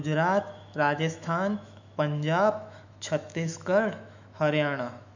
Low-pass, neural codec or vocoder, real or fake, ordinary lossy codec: 7.2 kHz; autoencoder, 48 kHz, 128 numbers a frame, DAC-VAE, trained on Japanese speech; fake; none